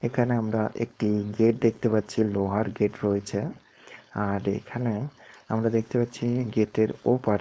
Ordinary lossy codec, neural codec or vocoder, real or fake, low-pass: none; codec, 16 kHz, 4.8 kbps, FACodec; fake; none